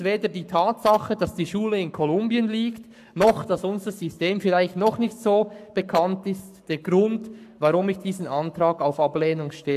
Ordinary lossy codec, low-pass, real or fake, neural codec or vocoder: AAC, 96 kbps; 14.4 kHz; fake; codec, 44.1 kHz, 7.8 kbps, Pupu-Codec